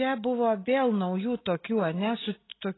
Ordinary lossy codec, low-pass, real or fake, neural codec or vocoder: AAC, 16 kbps; 7.2 kHz; real; none